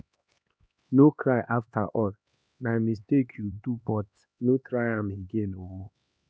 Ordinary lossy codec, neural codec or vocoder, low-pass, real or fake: none; codec, 16 kHz, 2 kbps, X-Codec, HuBERT features, trained on LibriSpeech; none; fake